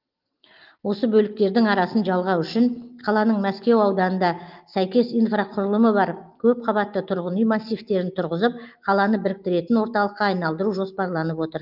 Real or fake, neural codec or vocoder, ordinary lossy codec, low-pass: real; none; Opus, 32 kbps; 5.4 kHz